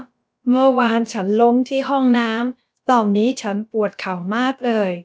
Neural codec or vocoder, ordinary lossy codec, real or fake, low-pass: codec, 16 kHz, about 1 kbps, DyCAST, with the encoder's durations; none; fake; none